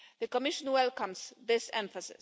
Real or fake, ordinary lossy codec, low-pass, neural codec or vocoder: real; none; none; none